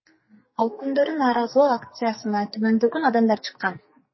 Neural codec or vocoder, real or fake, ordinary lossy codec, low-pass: codec, 44.1 kHz, 2.6 kbps, SNAC; fake; MP3, 24 kbps; 7.2 kHz